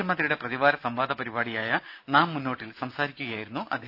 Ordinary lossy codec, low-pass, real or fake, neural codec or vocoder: none; 5.4 kHz; real; none